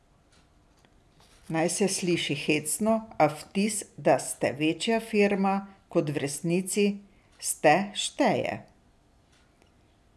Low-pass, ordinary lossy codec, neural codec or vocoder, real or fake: none; none; none; real